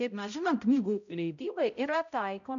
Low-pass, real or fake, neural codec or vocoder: 7.2 kHz; fake; codec, 16 kHz, 0.5 kbps, X-Codec, HuBERT features, trained on balanced general audio